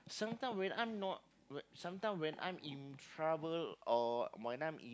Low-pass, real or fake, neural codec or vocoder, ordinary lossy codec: none; real; none; none